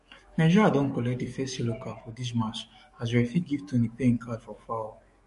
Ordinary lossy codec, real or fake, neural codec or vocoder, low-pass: MP3, 48 kbps; fake; codec, 44.1 kHz, 7.8 kbps, DAC; 14.4 kHz